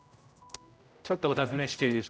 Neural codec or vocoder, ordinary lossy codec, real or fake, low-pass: codec, 16 kHz, 0.5 kbps, X-Codec, HuBERT features, trained on general audio; none; fake; none